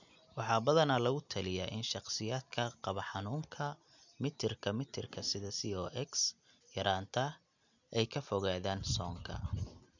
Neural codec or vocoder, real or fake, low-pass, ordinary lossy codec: none; real; 7.2 kHz; none